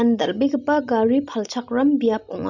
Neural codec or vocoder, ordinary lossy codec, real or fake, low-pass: none; none; real; 7.2 kHz